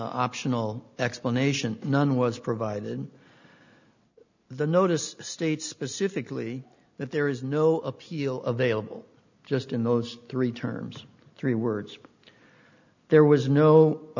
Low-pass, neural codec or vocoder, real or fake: 7.2 kHz; none; real